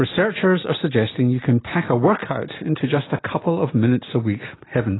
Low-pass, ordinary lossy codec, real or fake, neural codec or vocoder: 7.2 kHz; AAC, 16 kbps; real; none